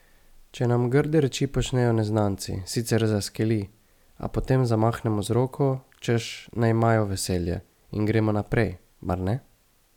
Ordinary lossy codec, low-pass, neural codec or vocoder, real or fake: none; 19.8 kHz; none; real